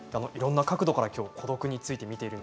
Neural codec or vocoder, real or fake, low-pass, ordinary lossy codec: none; real; none; none